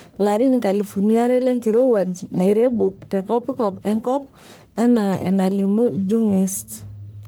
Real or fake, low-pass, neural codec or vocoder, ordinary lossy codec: fake; none; codec, 44.1 kHz, 1.7 kbps, Pupu-Codec; none